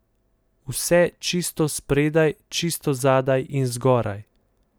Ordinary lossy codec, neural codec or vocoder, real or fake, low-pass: none; none; real; none